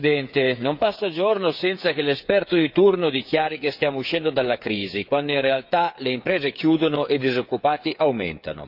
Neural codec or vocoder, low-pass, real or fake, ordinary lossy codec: codec, 16 kHz, 8 kbps, FreqCodec, smaller model; 5.4 kHz; fake; none